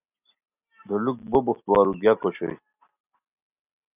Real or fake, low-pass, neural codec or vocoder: real; 3.6 kHz; none